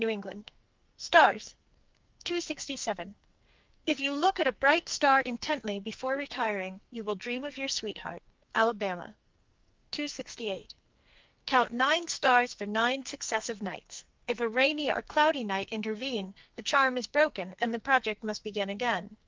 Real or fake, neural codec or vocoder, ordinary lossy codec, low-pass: fake; codec, 44.1 kHz, 2.6 kbps, SNAC; Opus, 24 kbps; 7.2 kHz